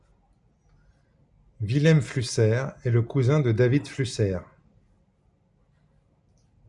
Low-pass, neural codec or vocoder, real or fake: 10.8 kHz; none; real